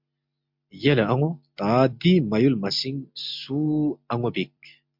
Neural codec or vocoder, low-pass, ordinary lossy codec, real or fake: none; 5.4 kHz; MP3, 48 kbps; real